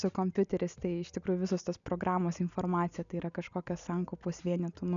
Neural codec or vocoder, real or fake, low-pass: none; real; 7.2 kHz